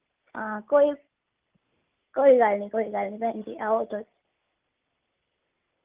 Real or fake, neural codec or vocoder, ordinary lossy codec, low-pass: real; none; Opus, 32 kbps; 3.6 kHz